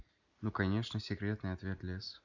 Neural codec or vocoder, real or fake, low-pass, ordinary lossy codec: none; real; 7.2 kHz; none